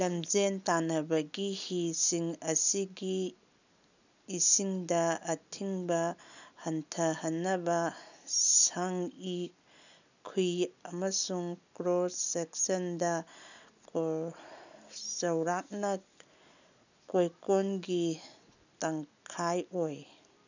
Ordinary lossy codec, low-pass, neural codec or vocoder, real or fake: none; 7.2 kHz; none; real